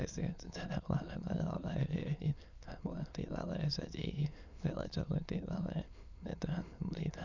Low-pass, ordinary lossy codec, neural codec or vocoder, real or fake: 7.2 kHz; none; autoencoder, 22.05 kHz, a latent of 192 numbers a frame, VITS, trained on many speakers; fake